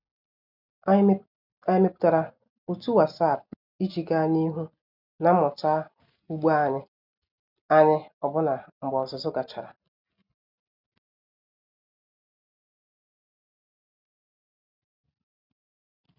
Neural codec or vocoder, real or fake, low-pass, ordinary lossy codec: none; real; 5.4 kHz; none